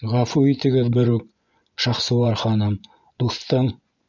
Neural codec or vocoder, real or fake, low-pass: vocoder, 24 kHz, 100 mel bands, Vocos; fake; 7.2 kHz